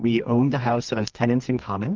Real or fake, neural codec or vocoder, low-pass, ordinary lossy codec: fake; codec, 24 kHz, 0.9 kbps, WavTokenizer, medium music audio release; 7.2 kHz; Opus, 32 kbps